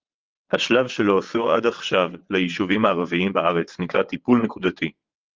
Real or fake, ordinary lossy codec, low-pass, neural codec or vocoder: fake; Opus, 32 kbps; 7.2 kHz; vocoder, 22.05 kHz, 80 mel bands, WaveNeXt